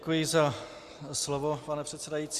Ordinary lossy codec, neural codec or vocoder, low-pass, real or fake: Opus, 64 kbps; none; 14.4 kHz; real